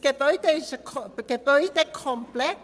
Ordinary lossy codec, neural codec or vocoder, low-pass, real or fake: none; vocoder, 22.05 kHz, 80 mel bands, Vocos; none; fake